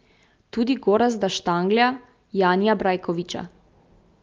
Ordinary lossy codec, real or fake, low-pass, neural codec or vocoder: Opus, 32 kbps; real; 7.2 kHz; none